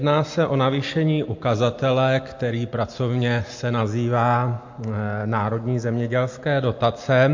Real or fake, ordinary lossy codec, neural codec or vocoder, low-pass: real; MP3, 48 kbps; none; 7.2 kHz